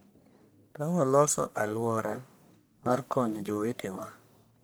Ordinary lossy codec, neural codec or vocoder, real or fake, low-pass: none; codec, 44.1 kHz, 1.7 kbps, Pupu-Codec; fake; none